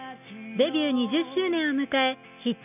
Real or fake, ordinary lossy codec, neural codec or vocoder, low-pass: real; none; none; 3.6 kHz